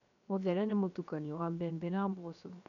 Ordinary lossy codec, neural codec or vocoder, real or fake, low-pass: none; codec, 16 kHz, 0.7 kbps, FocalCodec; fake; 7.2 kHz